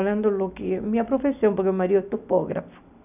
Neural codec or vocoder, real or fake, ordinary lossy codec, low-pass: none; real; none; 3.6 kHz